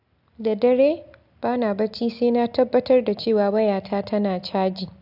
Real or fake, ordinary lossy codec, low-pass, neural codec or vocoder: real; AAC, 48 kbps; 5.4 kHz; none